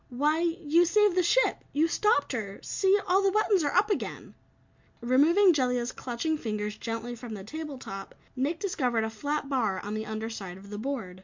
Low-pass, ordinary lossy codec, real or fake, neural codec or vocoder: 7.2 kHz; MP3, 64 kbps; real; none